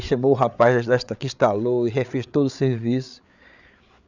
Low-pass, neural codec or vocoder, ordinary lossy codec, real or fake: 7.2 kHz; codec, 16 kHz, 8 kbps, FreqCodec, larger model; none; fake